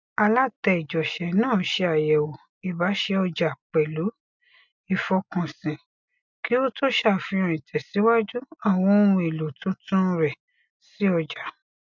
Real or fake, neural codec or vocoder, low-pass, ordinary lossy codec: real; none; 7.2 kHz; MP3, 64 kbps